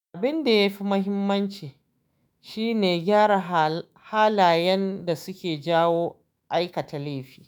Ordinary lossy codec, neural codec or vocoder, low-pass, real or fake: none; autoencoder, 48 kHz, 128 numbers a frame, DAC-VAE, trained on Japanese speech; none; fake